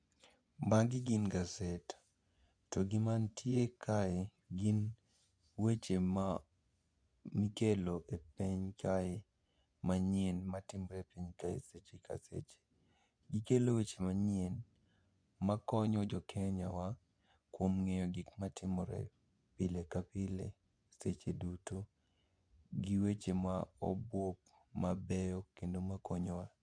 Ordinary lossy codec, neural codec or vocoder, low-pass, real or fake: none; vocoder, 24 kHz, 100 mel bands, Vocos; 9.9 kHz; fake